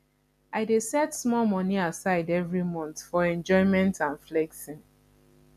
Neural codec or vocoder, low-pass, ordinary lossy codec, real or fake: none; 14.4 kHz; none; real